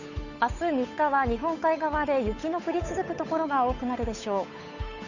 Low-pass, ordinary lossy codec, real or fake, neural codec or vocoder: 7.2 kHz; none; fake; codec, 16 kHz, 8 kbps, FunCodec, trained on Chinese and English, 25 frames a second